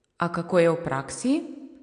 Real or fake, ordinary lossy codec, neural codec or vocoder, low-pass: real; AAC, 48 kbps; none; 9.9 kHz